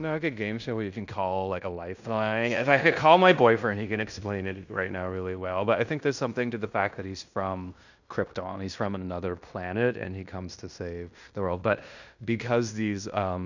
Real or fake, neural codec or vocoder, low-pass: fake; codec, 16 kHz in and 24 kHz out, 0.9 kbps, LongCat-Audio-Codec, fine tuned four codebook decoder; 7.2 kHz